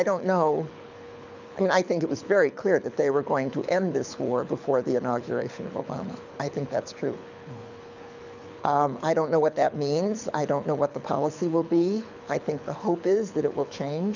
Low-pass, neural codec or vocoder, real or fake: 7.2 kHz; codec, 24 kHz, 6 kbps, HILCodec; fake